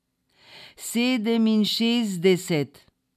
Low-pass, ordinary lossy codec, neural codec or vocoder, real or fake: 14.4 kHz; none; none; real